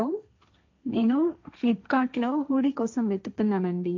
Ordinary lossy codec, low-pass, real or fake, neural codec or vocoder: MP3, 64 kbps; 7.2 kHz; fake; codec, 16 kHz, 1.1 kbps, Voila-Tokenizer